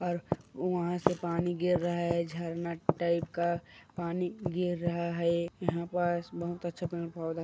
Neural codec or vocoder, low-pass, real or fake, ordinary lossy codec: none; none; real; none